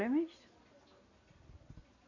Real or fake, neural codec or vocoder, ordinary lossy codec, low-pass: real; none; none; 7.2 kHz